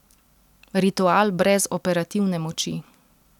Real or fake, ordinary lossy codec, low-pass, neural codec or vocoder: real; none; 19.8 kHz; none